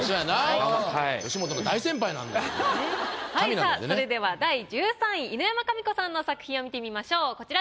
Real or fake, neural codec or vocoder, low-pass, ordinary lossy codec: real; none; none; none